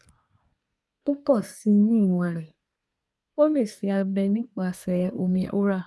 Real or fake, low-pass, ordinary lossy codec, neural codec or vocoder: fake; none; none; codec, 24 kHz, 1 kbps, SNAC